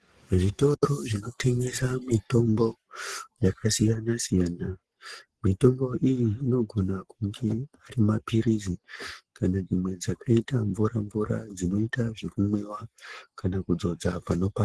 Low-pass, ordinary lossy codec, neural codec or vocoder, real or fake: 10.8 kHz; Opus, 16 kbps; codec, 44.1 kHz, 7.8 kbps, Pupu-Codec; fake